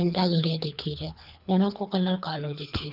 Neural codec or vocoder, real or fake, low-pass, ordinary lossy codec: codec, 24 kHz, 3 kbps, HILCodec; fake; 5.4 kHz; none